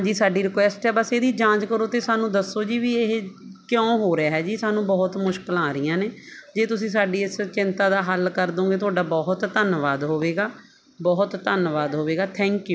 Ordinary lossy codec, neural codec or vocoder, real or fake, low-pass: none; none; real; none